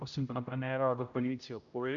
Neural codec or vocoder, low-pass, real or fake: codec, 16 kHz, 0.5 kbps, X-Codec, HuBERT features, trained on general audio; 7.2 kHz; fake